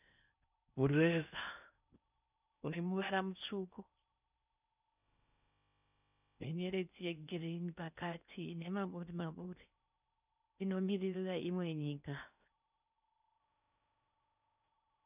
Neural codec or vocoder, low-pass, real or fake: codec, 16 kHz in and 24 kHz out, 0.6 kbps, FocalCodec, streaming, 4096 codes; 3.6 kHz; fake